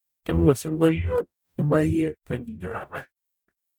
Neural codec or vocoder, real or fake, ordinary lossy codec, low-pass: codec, 44.1 kHz, 0.9 kbps, DAC; fake; none; none